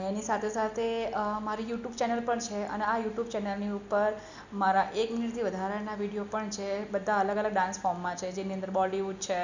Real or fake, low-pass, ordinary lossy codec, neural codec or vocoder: real; 7.2 kHz; none; none